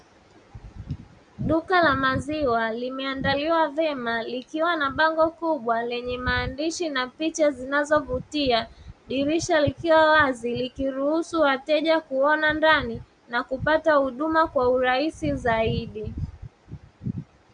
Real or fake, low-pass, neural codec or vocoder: real; 9.9 kHz; none